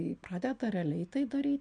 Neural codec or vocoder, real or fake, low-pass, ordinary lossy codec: none; real; 9.9 kHz; MP3, 64 kbps